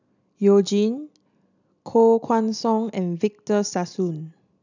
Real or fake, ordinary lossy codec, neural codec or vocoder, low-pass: real; none; none; 7.2 kHz